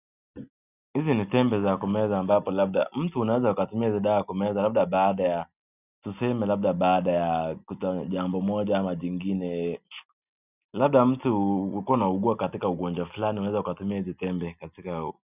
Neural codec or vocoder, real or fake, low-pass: none; real; 3.6 kHz